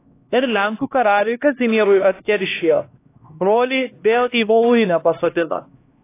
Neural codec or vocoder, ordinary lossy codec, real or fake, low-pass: codec, 16 kHz, 1 kbps, X-Codec, HuBERT features, trained on LibriSpeech; AAC, 24 kbps; fake; 3.6 kHz